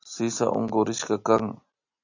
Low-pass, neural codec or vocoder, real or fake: 7.2 kHz; none; real